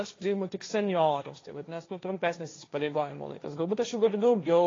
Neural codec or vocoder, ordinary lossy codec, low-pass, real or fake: codec, 16 kHz, 1.1 kbps, Voila-Tokenizer; AAC, 32 kbps; 7.2 kHz; fake